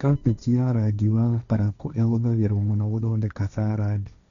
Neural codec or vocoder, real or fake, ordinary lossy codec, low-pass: codec, 16 kHz, 1.1 kbps, Voila-Tokenizer; fake; none; 7.2 kHz